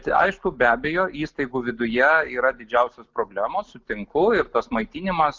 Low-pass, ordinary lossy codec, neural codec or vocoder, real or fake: 7.2 kHz; Opus, 24 kbps; none; real